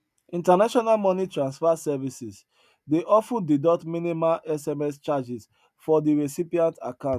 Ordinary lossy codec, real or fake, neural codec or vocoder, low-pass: none; real; none; 14.4 kHz